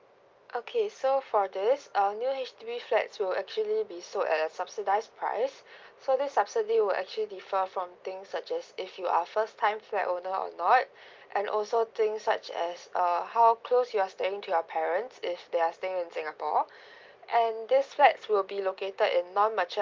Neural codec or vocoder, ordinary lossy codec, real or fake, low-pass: none; Opus, 24 kbps; real; 7.2 kHz